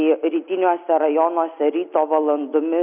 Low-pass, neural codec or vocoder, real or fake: 3.6 kHz; none; real